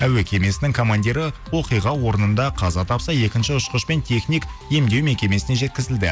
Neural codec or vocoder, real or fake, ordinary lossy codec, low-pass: none; real; none; none